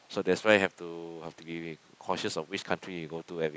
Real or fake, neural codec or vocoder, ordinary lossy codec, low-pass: real; none; none; none